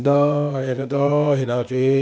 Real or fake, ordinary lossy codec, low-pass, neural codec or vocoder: fake; none; none; codec, 16 kHz, 0.8 kbps, ZipCodec